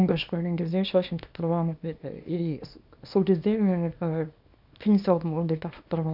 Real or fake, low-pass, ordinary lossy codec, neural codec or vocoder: fake; 5.4 kHz; none; codec, 24 kHz, 0.9 kbps, WavTokenizer, small release